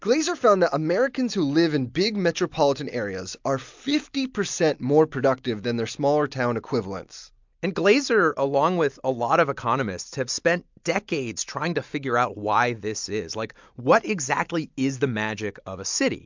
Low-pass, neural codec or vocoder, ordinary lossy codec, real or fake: 7.2 kHz; none; MP3, 64 kbps; real